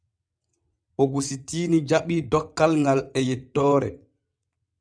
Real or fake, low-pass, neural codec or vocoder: fake; 9.9 kHz; vocoder, 22.05 kHz, 80 mel bands, WaveNeXt